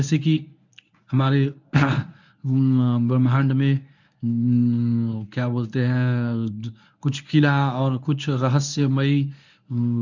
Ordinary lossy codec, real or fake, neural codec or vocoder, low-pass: none; fake; codec, 24 kHz, 0.9 kbps, WavTokenizer, medium speech release version 1; 7.2 kHz